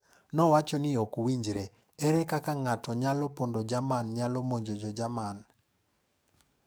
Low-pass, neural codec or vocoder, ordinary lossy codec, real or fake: none; codec, 44.1 kHz, 7.8 kbps, DAC; none; fake